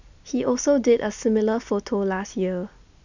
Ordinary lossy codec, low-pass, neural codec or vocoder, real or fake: none; 7.2 kHz; none; real